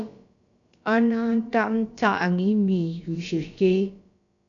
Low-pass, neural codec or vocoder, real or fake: 7.2 kHz; codec, 16 kHz, about 1 kbps, DyCAST, with the encoder's durations; fake